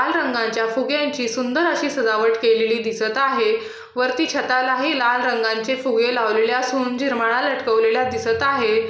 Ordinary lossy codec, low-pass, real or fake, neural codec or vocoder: none; none; real; none